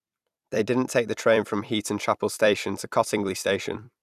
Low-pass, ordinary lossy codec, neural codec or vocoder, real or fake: 14.4 kHz; none; vocoder, 44.1 kHz, 128 mel bands every 256 samples, BigVGAN v2; fake